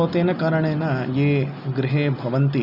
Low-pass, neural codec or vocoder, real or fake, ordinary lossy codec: 5.4 kHz; none; real; none